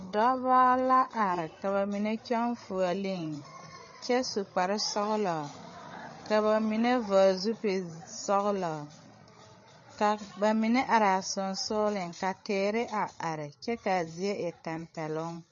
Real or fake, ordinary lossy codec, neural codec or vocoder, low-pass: fake; MP3, 32 kbps; codec, 16 kHz, 8 kbps, FreqCodec, larger model; 7.2 kHz